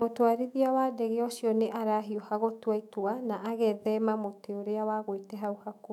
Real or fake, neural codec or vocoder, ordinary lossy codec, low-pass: fake; autoencoder, 48 kHz, 128 numbers a frame, DAC-VAE, trained on Japanese speech; none; 19.8 kHz